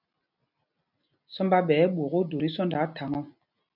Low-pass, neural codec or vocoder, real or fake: 5.4 kHz; none; real